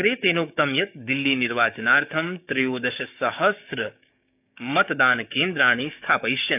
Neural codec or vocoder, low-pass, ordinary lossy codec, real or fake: codec, 16 kHz, 6 kbps, DAC; 3.6 kHz; none; fake